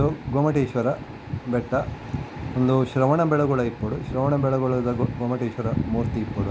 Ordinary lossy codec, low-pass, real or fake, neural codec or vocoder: none; none; real; none